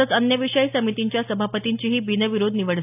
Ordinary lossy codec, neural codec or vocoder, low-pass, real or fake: AAC, 32 kbps; none; 3.6 kHz; real